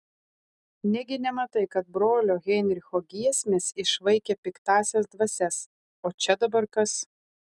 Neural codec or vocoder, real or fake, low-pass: none; real; 10.8 kHz